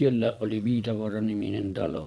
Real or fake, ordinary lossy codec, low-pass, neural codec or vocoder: fake; none; none; vocoder, 22.05 kHz, 80 mel bands, Vocos